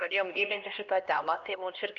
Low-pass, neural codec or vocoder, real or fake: 7.2 kHz; codec, 16 kHz, 1 kbps, X-Codec, HuBERT features, trained on LibriSpeech; fake